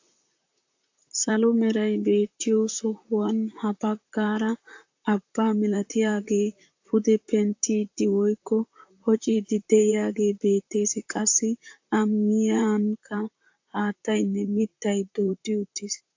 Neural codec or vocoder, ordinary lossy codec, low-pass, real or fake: vocoder, 44.1 kHz, 128 mel bands, Pupu-Vocoder; AAC, 48 kbps; 7.2 kHz; fake